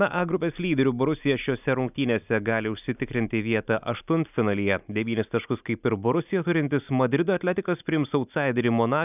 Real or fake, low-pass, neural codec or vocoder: real; 3.6 kHz; none